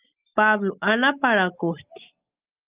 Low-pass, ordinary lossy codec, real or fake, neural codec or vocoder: 3.6 kHz; Opus, 24 kbps; real; none